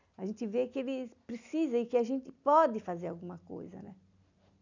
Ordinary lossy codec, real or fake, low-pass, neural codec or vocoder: none; real; 7.2 kHz; none